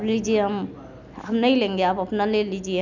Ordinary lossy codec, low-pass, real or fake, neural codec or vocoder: none; 7.2 kHz; real; none